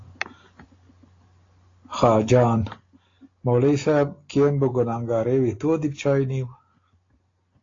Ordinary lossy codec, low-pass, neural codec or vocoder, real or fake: AAC, 32 kbps; 7.2 kHz; none; real